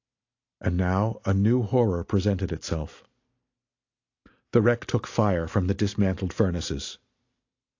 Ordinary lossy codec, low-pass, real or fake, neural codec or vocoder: AAC, 48 kbps; 7.2 kHz; real; none